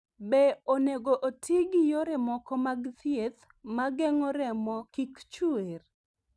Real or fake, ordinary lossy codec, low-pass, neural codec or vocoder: real; none; none; none